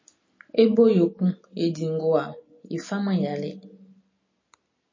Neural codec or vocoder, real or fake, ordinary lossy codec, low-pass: none; real; MP3, 32 kbps; 7.2 kHz